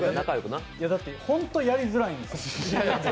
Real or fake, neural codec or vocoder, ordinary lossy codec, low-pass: real; none; none; none